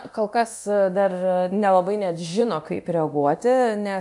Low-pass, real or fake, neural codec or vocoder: 10.8 kHz; fake; codec, 24 kHz, 0.9 kbps, DualCodec